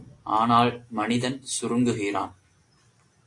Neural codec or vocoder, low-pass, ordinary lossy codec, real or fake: none; 10.8 kHz; AAC, 48 kbps; real